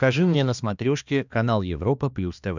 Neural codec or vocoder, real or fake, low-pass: codec, 16 kHz, 2 kbps, X-Codec, HuBERT features, trained on balanced general audio; fake; 7.2 kHz